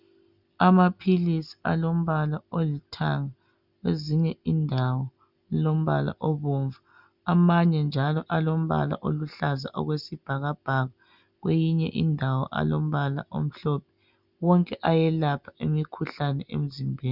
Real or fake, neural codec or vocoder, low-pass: real; none; 5.4 kHz